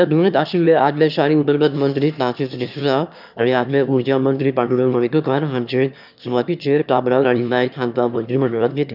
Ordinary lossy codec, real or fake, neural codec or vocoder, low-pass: none; fake; autoencoder, 22.05 kHz, a latent of 192 numbers a frame, VITS, trained on one speaker; 5.4 kHz